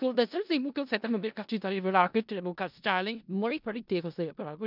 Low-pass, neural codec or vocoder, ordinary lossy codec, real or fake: 5.4 kHz; codec, 16 kHz in and 24 kHz out, 0.4 kbps, LongCat-Audio-Codec, four codebook decoder; none; fake